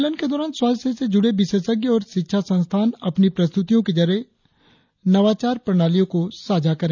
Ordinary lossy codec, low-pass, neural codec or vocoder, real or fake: none; 7.2 kHz; none; real